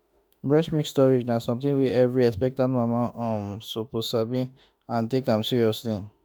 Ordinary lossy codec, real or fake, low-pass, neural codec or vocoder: none; fake; none; autoencoder, 48 kHz, 32 numbers a frame, DAC-VAE, trained on Japanese speech